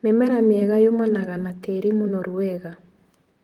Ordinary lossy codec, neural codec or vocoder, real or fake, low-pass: Opus, 24 kbps; vocoder, 44.1 kHz, 128 mel bands, Pupu-Vocoder; fake; 19.8 kHz